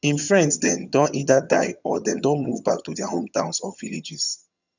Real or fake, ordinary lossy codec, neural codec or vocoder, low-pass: fake; none; vocoder, 22.05 kHz, 80 mel bands, HiFi-GAN; 7.2 kHz